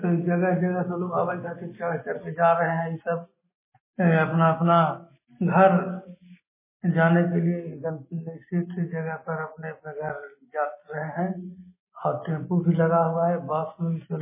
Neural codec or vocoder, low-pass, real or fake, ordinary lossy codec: none; 3.6 kHz; real; MP3, 16 kbps